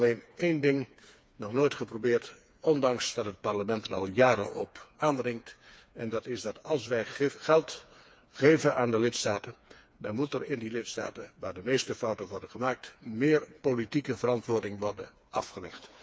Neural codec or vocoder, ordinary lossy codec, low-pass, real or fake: codec, 16 kHz, 4 kbps, FreqCodec, smaller model; none; none; fake